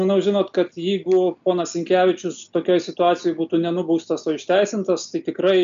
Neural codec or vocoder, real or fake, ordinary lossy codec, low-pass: none; real; MP3, 64 kbps; 7.2 kHz